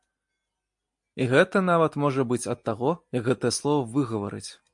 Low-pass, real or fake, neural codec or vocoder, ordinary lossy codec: 10.8 kHz; real; none; MP3, 64 kbps